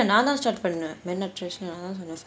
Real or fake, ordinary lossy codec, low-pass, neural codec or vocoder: real; none; none; none